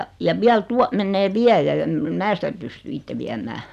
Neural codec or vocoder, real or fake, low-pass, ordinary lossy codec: none; real; 14.4 kHz; none